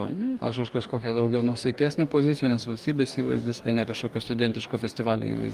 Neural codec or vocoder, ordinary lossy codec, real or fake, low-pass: codec, 44.1 kHz, 2.6 kbps, DAC; Opus, 32 kbps; fake; 14.4 kHz